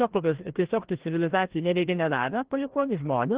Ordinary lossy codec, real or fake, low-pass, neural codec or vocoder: Opus, 16 kbps; fake; 3.6 kHz; codec, 16 kHz, 1 kbps, FreqCodec, larger model